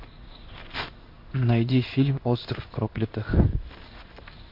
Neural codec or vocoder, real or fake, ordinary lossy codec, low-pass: codec, 16 kHz in and 24 kHz out, 1 kbps, XY-Tokenizer; fake; MP3, 32 kbps; 5.4 kHz